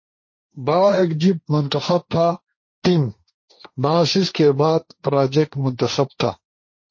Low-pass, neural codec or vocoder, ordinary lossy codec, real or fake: 7.2 kHz; codec, 16 kHz, 1.1 kbps, Voila-Tokenizer; MP3, 32 kbps; fake